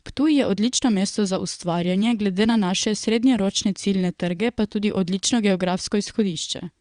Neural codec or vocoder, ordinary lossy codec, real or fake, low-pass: vocoder, 22.05 kHz, 80 mel bands, WaveNeXt; Opus, 64 kbps; fake; 9.9 kHz